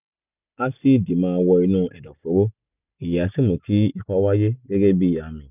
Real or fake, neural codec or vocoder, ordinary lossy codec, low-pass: real; none; none; 3.6 kHz